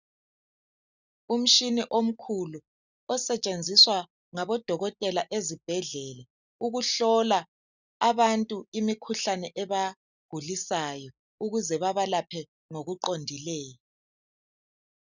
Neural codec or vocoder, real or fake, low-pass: none; real; 7.2 kHz